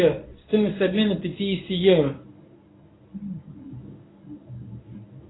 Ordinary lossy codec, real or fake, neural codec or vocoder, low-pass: AAC, 16 kbps; fake; codec, 24 kHz, 0.9 kbps, WavTokenizer, medium speech release version 1; 7.2 kHz